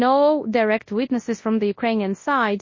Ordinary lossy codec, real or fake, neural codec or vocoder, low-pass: MP3, 32 kbps; fake; codec, 24 kHz, 0.9 kbps, WavTokenizer, large speech release; 7.2 kHz